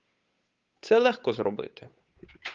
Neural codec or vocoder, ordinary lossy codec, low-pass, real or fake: codec, 16 kHz, 8 kbps, FunCodec, trained on Chinese and English, 25 frames a second; Opus, 32 kbps; 7.2 kHz; fake